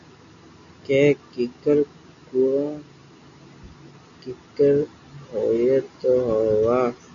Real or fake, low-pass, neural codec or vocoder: real; 7.2 kHz; none